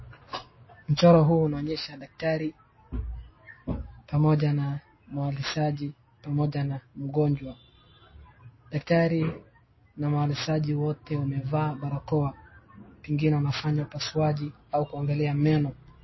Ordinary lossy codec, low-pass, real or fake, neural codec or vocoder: MP3, 24 kbps; 7.2 kHz; real; none